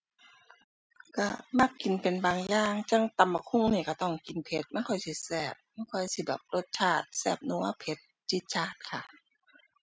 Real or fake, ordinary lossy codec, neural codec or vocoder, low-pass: real; none; none; none